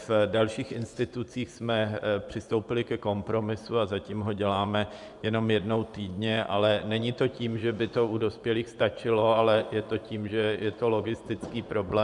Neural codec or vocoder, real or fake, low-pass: vocoder, 24 kHz, 100 mel bands, Vocos; fake; 10.8 kHz